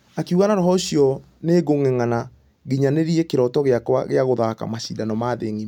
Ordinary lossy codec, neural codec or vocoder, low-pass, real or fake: none; none; 19.8 kHz; real